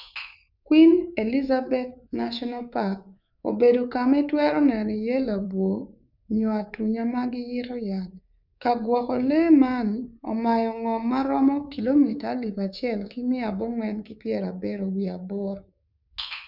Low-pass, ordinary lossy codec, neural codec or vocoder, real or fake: 5.4 kHz; none; codec, 24 kHz, 3.1 kbps, DualCodec; fake